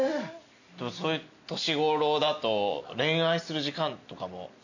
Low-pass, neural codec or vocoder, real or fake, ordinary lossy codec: 7.2 kHz; none; real; none